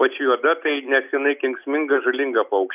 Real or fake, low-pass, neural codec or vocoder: real; 3.6 kHz; none